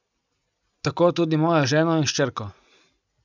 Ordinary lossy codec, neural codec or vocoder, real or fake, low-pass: none; none; real; 7.2 kHz